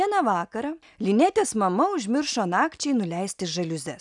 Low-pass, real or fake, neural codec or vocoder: 10.8 kHz; real; none